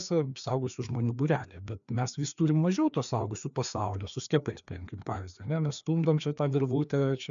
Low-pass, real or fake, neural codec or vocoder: 7.2 kHz; fake; codec, 16 kHz, 2 kbps, FreqCodec, larger model